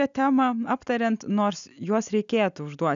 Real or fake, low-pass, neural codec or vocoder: real; 7.2 kHz; none